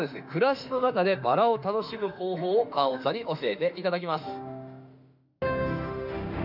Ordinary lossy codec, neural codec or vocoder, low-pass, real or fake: none; autoencoder, 48 kHz, 32 numbers a frame, DAC-VAE, trained on Japanese speech; 5.4 kHz; fake